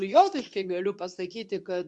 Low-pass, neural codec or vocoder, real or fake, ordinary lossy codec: 10.8 kHz; codec, 24 kHz, 0.9 kbps, WavTokenizer, medium speech release version 2; fake; MP3, 96 kbps